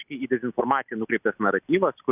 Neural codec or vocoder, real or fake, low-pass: none; real; 3.6 kHz